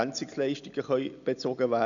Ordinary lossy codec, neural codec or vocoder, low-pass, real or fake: none; none; 7.2 kHz; real